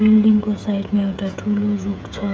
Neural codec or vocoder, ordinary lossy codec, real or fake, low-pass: codec, 16 kHz, 16 kbps, FreqCodec, smaller model; none; fake; none